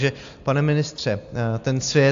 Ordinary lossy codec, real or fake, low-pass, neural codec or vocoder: AAC, 64 kbps; real; 7.2 kHz; none